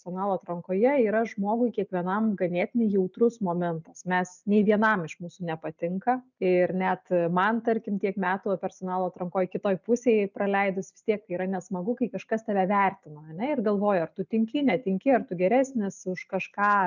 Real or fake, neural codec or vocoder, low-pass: real; none; 7.2 kHz